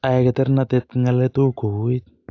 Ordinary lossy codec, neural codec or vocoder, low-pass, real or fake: AAC, 48 kbps; none; 7.2 kHz; real